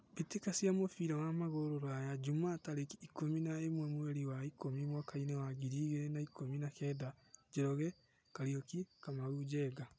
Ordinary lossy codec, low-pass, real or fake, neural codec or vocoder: none; none; real; none